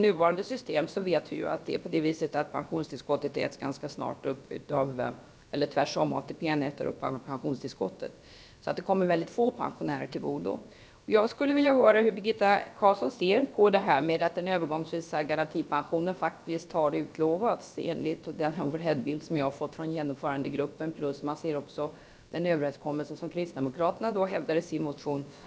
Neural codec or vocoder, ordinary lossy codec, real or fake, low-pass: codec, 16 kHz, about 1 kbps, DyCAST, with the encoder's durations; none; fake; none